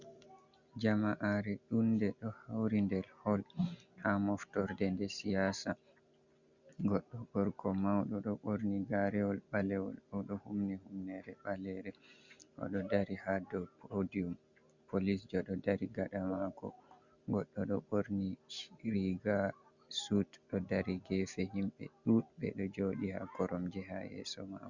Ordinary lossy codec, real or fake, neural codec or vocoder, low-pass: Opus, 64 kbps; real; none; 7.2 kHz